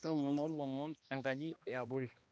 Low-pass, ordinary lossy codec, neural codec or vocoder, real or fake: none; none; codec, 16 kHz, 1 kbps, X-Codec, HuBERT features, trained on balanced general audio; fake